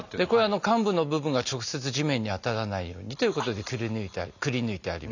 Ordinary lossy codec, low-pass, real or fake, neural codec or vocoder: none; 7.2 kHz; real; none